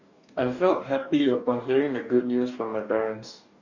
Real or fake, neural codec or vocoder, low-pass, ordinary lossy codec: fake; codec, 44.1 kHz, 2.6 kbps, DAC; 7.2 kHz; none